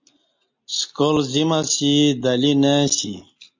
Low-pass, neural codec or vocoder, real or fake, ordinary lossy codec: 7.2 kHz; none; real; MP3, 64 kbps